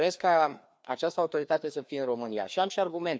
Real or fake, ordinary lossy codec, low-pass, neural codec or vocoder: fake; none; none; codec, 16 kHz, 2 kbps, FreqCodec, larger model